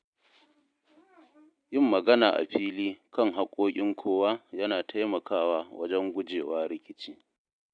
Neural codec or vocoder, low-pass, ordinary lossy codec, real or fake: none; 9.9 kHz; none; real